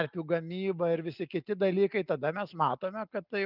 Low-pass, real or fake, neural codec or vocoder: 5.4 kHz; real; none